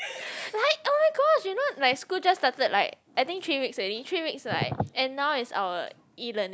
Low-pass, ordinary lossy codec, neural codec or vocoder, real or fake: none; none; none; real